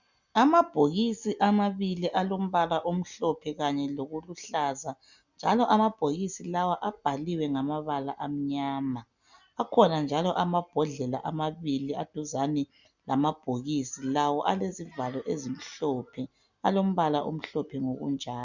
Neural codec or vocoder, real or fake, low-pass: none; real; 7.2 kHz